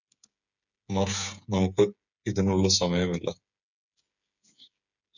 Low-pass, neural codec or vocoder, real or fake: 7.2 kHz; codec, 16 kHz, 8 kbps, FreqCodec, smaller model; fake